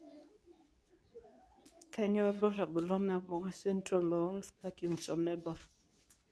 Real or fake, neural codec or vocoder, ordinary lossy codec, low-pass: fake; codec, 24 kHz, 0.9 kbps, WavTokenizer, medium speech release version 2; none; none